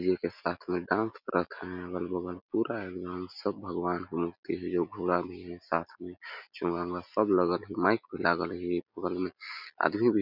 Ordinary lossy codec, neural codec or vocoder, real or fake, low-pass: none; none; real; 5.4 kHz